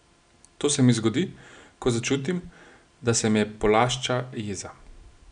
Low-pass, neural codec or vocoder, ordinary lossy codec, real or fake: 9.9 kHz; none; none; real